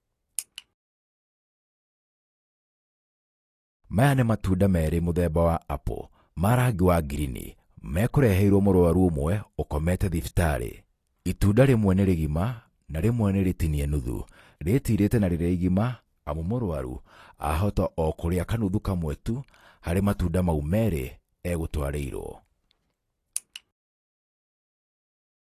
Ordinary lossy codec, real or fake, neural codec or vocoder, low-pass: AAC, 48 kbps; real; none; 14.4 kHz